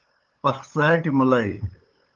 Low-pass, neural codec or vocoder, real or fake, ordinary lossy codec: 7.2 kHz; codec, 16 kHz, 8 kbps, FunCodec, trained on LibriTTS, 25 frames a second; fake; Opus, 32 kbps